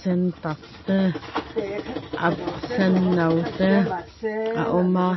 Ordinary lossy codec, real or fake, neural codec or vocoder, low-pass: MP3, 24 kbps; real; none; 7.2 kHz